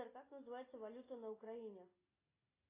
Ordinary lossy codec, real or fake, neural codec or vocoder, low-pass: AAC, 16 kbps; real; none; 3.6 kHz